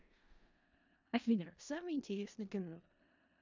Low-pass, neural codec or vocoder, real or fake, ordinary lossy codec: 7.2 kHz; codec, 16 kHz in and 24 kHz out, 0.4 kbps, LongCat-Audio-Codec, four codebook decoder; fake; MP3, 64 kbps